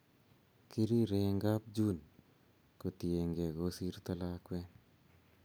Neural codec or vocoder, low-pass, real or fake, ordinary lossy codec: none; none; real; none